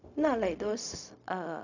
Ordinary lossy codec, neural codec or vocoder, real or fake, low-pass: none; codec, 16 kHz, 0.4 kbps, LongCat-Audio-Codec; fake; 7.2 kHz